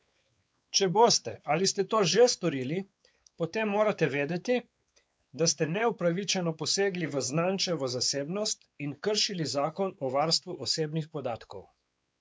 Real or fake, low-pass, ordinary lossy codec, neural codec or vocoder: fake; none; none; codec, 16 kHz, 4 kbps, X-Codec, WavLM features, trained on Multilingual LibriSpeech